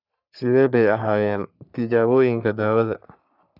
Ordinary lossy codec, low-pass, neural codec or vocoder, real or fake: none; 5.4 kHz; codec, 44.1 kHz, 3.4 kbps, Pupu-Codec; fake